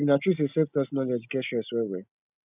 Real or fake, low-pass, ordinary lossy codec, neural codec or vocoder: real; 3.6 kHz; none; none